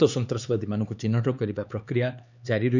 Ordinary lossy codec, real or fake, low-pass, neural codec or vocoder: none; fake; 7.2 kHz; codec, 16 kHz, 4 kbps, X-Codec, HuBERT features, trained on LibriSpeech